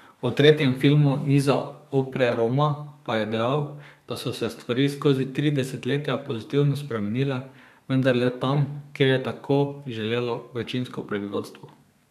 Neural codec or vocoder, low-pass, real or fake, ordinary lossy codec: codec, 32 kHz, 1.9 kbps, SNAC; 14.4 kHz; fake; none